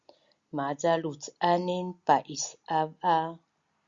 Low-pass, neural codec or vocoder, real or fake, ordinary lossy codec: 7.2 kHz; none; real; Opus, 64 kbps